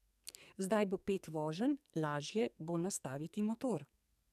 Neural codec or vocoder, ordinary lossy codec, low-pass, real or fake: codec, 44.1 kHz, 2.6 kbps, SNAC; none; 14.4 kHz; fake